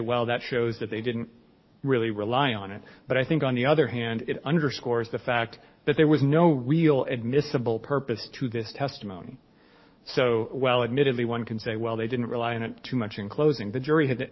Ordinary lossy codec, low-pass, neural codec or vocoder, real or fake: MP3, 24 kbps; 7.2 kHz; codec, 44.1 kHz, 7.8 kbps, DAC; fake